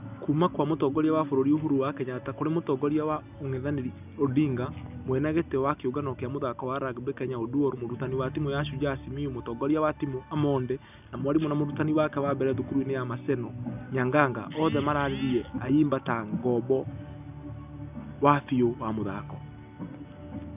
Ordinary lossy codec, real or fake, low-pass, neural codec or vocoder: none; real; 3.6 kHz; none